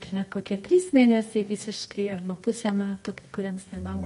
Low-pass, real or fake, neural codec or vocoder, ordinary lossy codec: 10.8 kHz; fake; codec, 24 kHz, 0.9 kbps, WavTokenizer, medium music audio release; MP3, 48 kbps